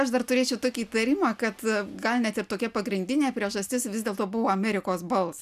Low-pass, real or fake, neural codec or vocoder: 14.4 kHz; real; none